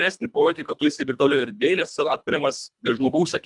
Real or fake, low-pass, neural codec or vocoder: fake; 10.8 kHz; codec, 24 kHz, 1.5 kbps, HILCodec